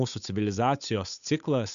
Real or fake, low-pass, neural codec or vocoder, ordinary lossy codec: fake; 7.2 kHz; codec, 16 kHz, 8 kbps, FunCodec, trained on Chinese and English, 25 frames a second; MP3, 96 kbps